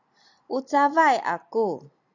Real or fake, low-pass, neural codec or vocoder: real; 7.2 kHz; none